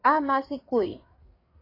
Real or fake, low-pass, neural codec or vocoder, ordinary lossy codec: fake; 5.4 kHz; codec, 16 kHz, 4 kbps, FreqCodec, larger model; AAC, 24 kbps